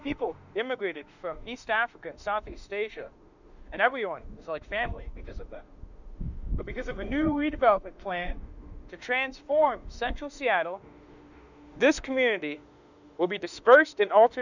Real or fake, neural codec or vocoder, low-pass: fake; autoencoder, 48 kHz, 32 numbers a frame, DAC-VAE, trained on Japanese speech; 7.2 kHz